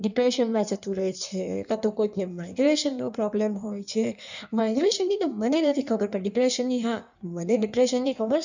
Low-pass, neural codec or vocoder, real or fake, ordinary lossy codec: 7.2 kHz; codec, 16 kHz in and 24 kHz out, 1.1 kbps, FireRedTTS-2 codec; fake; none